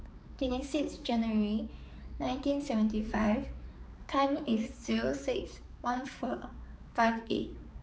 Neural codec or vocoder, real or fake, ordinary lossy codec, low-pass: codec, 16 kHz, 4 kbps, X-Codec, HuBERT features, trained on balanced general audio; fake; none; none